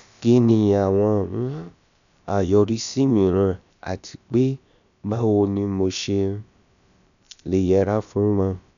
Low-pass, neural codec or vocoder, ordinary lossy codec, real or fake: 7.2 kHz; codec, 16 kHz, about 1 kbps, DyCAST, with the encoder's durations; none; fake